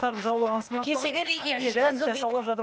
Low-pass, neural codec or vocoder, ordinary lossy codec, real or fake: none; codec, 16 kHz, 0.8 kbps, ZipCodec; none; fake